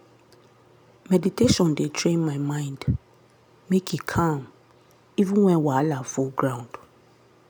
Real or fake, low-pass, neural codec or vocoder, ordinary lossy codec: real; none; none; none